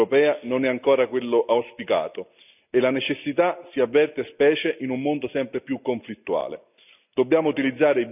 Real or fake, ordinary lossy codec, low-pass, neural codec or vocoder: real; none; 3.6 kHz; none